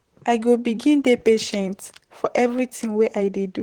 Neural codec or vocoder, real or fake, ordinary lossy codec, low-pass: vocoder, 44.1 kHz, 128 mel bands, Pupu-Vocoder; fake; Opus, 16 kbps; 19.8 kHz